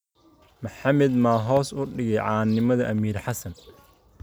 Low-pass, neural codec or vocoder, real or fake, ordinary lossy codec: none; none; real; none